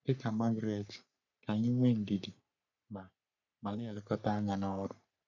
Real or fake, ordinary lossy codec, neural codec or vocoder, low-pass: fake; none; codec, 44.1 kHz, 3.4 kbps, Pupu-Codec; 7.2 kHz